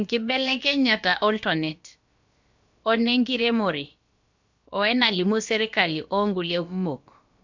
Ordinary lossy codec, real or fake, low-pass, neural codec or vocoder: MP3, 64 kbps; fake; 7.2 kHz; codec, 16 kHz, about 1 kbps, DyCAST, with the encoder's durations